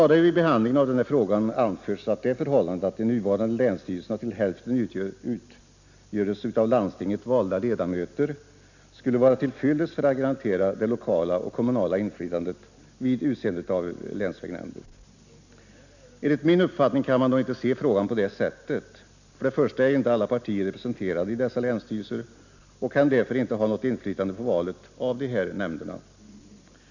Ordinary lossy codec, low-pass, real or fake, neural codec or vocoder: none; 7.2 kHz; real; none